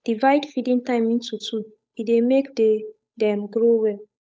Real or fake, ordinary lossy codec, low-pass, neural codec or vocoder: fake; none; none; codec, 16 kHz, 8 kbps, FunCodec, trained on Chinese and English, 25 frames a second